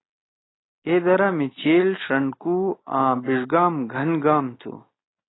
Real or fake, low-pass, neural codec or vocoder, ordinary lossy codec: real; 7.2 kHz; none; AAC, 16 kbps